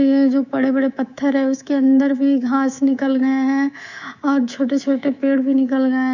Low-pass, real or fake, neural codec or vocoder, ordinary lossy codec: 7.2 kHz; fake; codec, 24 kHz, 3.1 kbps, DualCodec; none